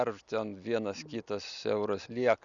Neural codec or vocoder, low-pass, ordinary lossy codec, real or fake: none; 7.2 kHz; Opus, 64 kbps; real